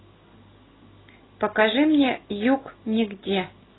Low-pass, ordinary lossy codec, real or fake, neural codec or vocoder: 7.2 kHz; AAC, 16 kbps; real; none